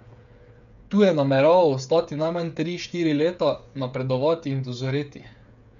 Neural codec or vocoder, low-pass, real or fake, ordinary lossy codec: codec, 16 kHz, 8 kbps, FreqCodec, smaller model; 7.2 kHz; fake; none